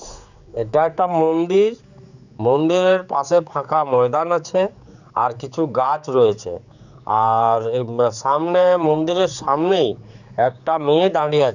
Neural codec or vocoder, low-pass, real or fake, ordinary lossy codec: codec, 16 kHz, 4 kbps, X-Codec, HuBERT features, trained on general audio; 7.2 kHz; fake; none